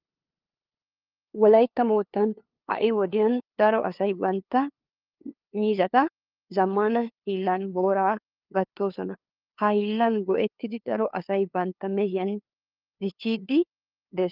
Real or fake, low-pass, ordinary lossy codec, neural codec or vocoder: fake; 5.4 kHz; Opus, 24 kbps; codec, 16 kHz, 2 kbps, FunCodec, trained on LibriTTS, 25 frames a second